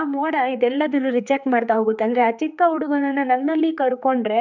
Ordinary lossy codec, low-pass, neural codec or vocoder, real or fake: none; 7.2 kHz; codec, 16 kHz, 4 kbps, X-Codec, HuBERT features, trained on general audio; fake